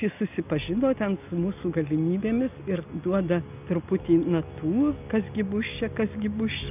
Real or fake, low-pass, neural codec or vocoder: real; 3.6 kHz; none